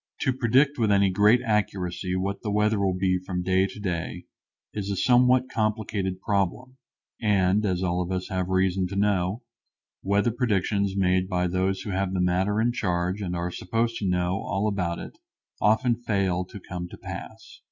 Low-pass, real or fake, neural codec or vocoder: 7.2 kHz; real; none